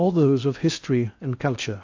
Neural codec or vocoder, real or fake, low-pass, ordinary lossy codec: codec, 16 kHz in and 24 kHz out, 0.6 kbps, FocalCodec, streaming, 2048 codes; fake; 7.2 kHz; AAC, 48 kbps